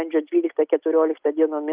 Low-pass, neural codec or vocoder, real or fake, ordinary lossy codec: 3.6 kHz; none; real; Opus, 24 kbps